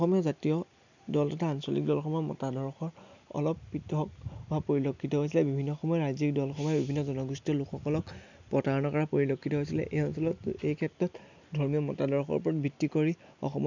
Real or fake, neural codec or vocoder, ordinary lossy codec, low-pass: real; none; none; 7.2 kHz